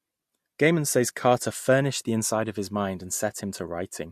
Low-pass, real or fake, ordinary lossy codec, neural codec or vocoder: 14.4 kHz; real; MP3, 64 kbps; none